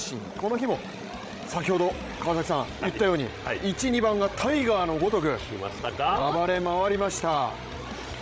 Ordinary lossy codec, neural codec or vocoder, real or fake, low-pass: none; codec, 16 kHz, 16 kbps, FreqCodec, larger model; fake; none